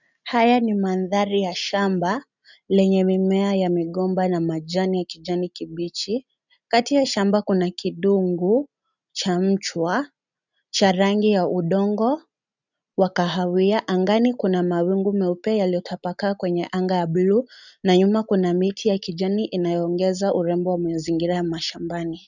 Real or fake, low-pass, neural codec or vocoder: real; 7.2 kHz; none